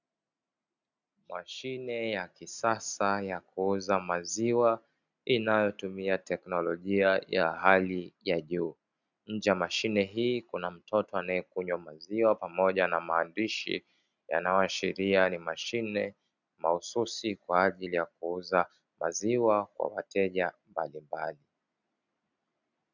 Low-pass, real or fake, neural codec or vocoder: 7.2 kHz; real; none